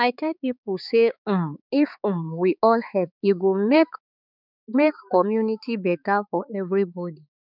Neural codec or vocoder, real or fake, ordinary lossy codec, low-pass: codec, 16 kHz, 4 kbps, X-Codec, HuBERT features, trained on balanced general audio; fake; none; 5.4 kHz